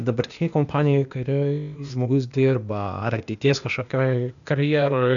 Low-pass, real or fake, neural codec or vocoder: 7.2 kHz; fake; codec, 16 kHz, 0.8 kbps, ZipCodec